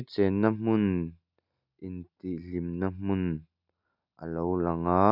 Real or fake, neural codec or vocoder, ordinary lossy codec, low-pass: real; none; none; 5.4 kHz